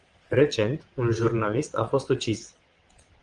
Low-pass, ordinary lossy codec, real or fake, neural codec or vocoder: 9.9 kHz; Opus, 24 kbps; fake; vocoder, 22.05 kHz, 80 mel bands, WaveNeXt